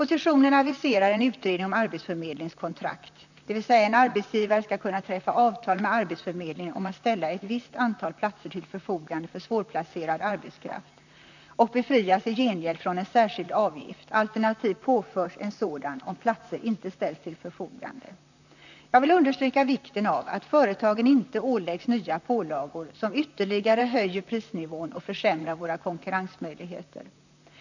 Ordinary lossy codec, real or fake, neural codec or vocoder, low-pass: none; fake; vocoder, 44.1 kHz, 128 mel bands, Pupu-Vocoder; 7.2 kHz